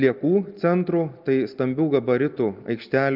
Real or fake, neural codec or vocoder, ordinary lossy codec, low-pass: real; none; Opus, 24 kbps; 5.4 kHz